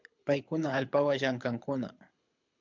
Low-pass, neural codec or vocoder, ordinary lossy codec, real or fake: 7.2 kHz; codec, 24 kHz, 6 kbps, HILCodec; MP3, 64 kbps; fake